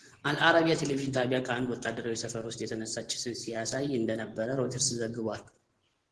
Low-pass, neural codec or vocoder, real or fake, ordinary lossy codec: 10.8 kHz; vocoder, 24 kHz, 100 mel bands, Vocos; fake; Opus, 16 kbps